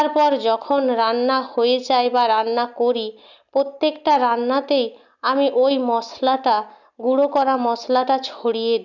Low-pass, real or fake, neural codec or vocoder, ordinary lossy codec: 7.2 kHz; real; none; none